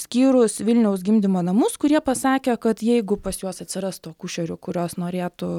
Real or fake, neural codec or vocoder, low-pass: real; none; 19.8 kHz